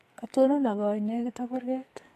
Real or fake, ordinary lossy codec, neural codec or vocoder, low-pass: fake; AAC, 48 kbps; codec, 44.1 kHz, 2.6 kbps, SNAC; 14.4 kHz